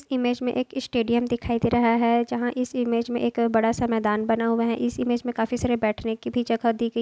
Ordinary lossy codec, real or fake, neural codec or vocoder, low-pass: none; real; none; none